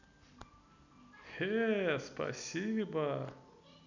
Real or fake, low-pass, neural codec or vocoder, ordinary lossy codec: real; 7.2 kHz; none; none